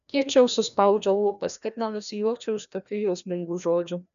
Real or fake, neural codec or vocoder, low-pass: fake; codec, 16 kHz, 1 kbps, FreqCodec, larger model; 7.2 kHz